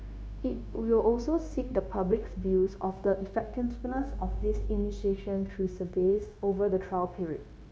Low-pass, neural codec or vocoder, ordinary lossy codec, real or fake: none; codec, 16 kHz, 0.9 kbps, LongCat-Audio-Codec; none; fake